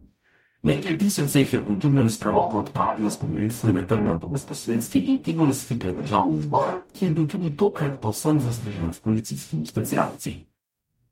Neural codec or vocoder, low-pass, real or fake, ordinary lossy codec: codec, 44.1 kHz, 0.9 kbps, DAC; 19.8 kHz; fake; MP3, 64 kbps